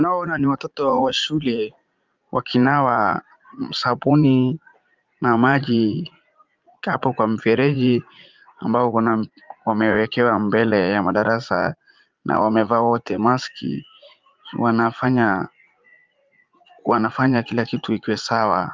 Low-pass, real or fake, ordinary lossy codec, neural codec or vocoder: 7.2 kHz; fake; Opus, 24 kbps; vocoder, 44.1 kHz, 80 mel bands, Vocos